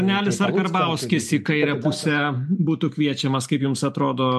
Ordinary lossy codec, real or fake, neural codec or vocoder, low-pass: MP3, 64 kbps; real; none; 14.4 kHz